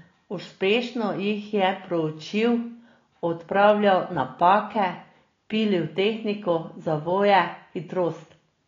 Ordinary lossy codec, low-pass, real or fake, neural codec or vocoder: AAC, 32 kbps; 7.2 kHz; real; none